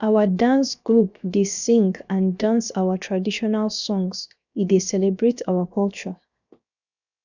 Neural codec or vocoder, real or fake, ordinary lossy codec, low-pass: codec, 16 kHz, 0.7 kbps, FocalCodec; fake; none; 7.2 kHz